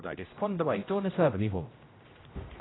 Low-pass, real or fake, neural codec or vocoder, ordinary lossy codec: 7.2 kHz; fake; codec, 16 kHz, 0.5 kbps, X-Codec, HuBERT features, trained on general audio; AAC, 16 kbps